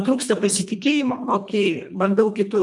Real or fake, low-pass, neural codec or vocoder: fake; 10.8 kHz; codec, 24 kHz, 1.5 kbps, HILCodec